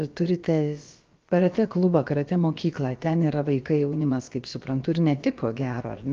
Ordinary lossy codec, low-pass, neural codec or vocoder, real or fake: Opus, 32 kbps; 7.2 kHz; codec, 16 kHz, about 1 kbps, DyCAST, with the encoder's durations; fake